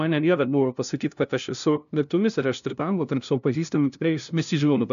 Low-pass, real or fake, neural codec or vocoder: 7.2 kHz; fake; codec, 16 kHz, 0.5 kbps, FunCodec, trained on LibriTTS, 25 frames a second